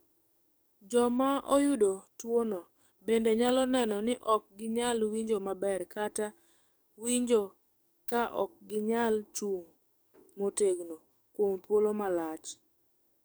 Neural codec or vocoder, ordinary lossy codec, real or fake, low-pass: codec, 44.1 kHz, 7.8 kbps, DAC; none; fake; none